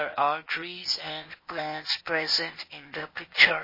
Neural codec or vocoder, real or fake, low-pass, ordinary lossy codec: codec, 16 kHz, 0.8 kbps, ZipCodec; fake; 5.4 kHz; MP3, 24 kbps